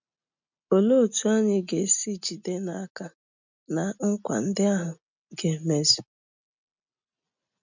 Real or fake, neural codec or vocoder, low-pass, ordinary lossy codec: real; none; 7.2 kHz; none